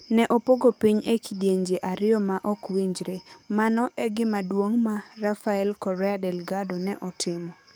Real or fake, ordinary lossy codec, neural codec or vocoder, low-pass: fake; none; codec, 44.1 kHz, 7.8 kbps, DAC; none